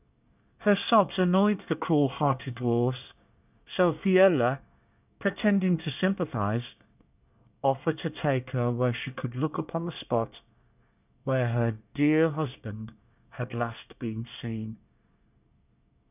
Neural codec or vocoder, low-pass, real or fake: codec, 24 kHz, 1 kbps, SNAC; 3.6 kHz; fake